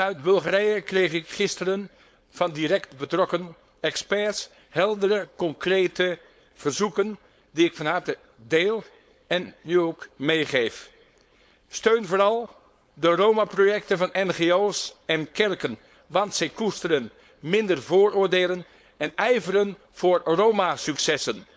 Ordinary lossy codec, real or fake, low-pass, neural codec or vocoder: none; fake; none; codec, 16 kHz, 4.8 kbps, FACodec